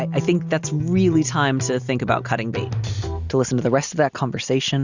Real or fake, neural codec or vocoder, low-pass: real; none; 7.2 kHz